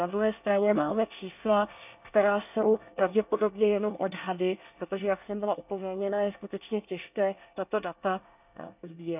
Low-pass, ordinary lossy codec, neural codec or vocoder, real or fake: 3.6 kHz; none; codec, 24 kHz, 1 kbps, SNAC; fake